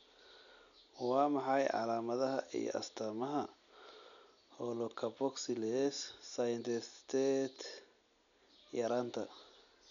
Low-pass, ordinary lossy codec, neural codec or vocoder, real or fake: 7.2 kHz; none; none; real